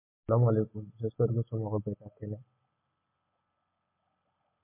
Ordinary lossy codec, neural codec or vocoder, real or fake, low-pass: MP3, 24 kbps; none; real; 3.6 kHz